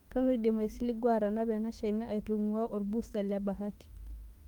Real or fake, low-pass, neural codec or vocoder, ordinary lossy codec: fake; 19.8 kHz; autoencoder, 48 kHz, 32 numbers a frame, DAC-VAE, trained on Japanese speech; Opus, 24 kbps